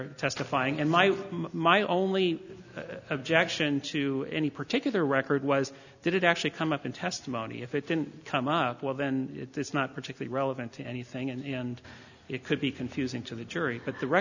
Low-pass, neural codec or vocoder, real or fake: 7.2 kHz; none; real